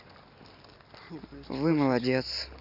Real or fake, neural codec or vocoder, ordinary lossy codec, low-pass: real; none; none; 5.4 kHz